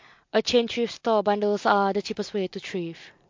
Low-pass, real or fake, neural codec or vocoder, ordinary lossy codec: 7.2 kHz; real; none; MP3, 48 kbps